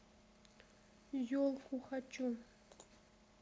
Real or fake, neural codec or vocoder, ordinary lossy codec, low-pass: real; none; none; none